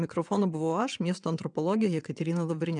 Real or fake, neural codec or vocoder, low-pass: fake; vocoder, 22.05 kHz, 80 mel bands, WaveNeXt; 9.9 kHz